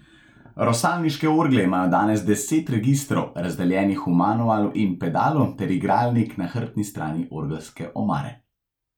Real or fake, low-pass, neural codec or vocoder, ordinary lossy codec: real; 19.8 kHz; none; none